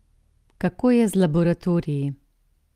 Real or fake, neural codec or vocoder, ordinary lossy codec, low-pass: real; none; Opus, 32 kbps; 14.4 kHz